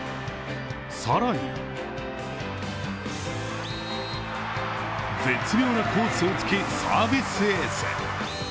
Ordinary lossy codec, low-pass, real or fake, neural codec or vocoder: none; none; real; none